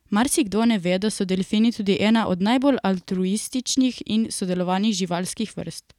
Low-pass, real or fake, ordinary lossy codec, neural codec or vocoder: 19.8 kHz; real; none; none